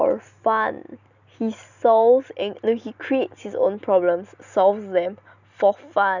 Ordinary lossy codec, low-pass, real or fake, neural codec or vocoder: none; 7.2 kHz; real; none